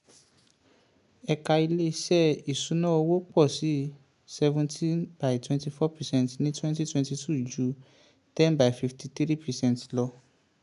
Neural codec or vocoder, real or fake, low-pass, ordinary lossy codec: none; real; 10.8 kHz; MP3, 96 kbps